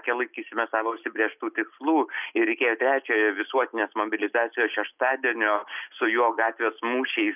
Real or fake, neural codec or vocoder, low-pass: real; none; 3.6 kHz